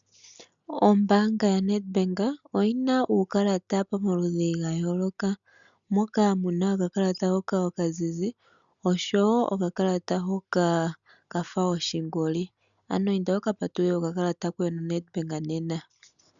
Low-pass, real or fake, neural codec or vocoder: 7.2 kHz; real; none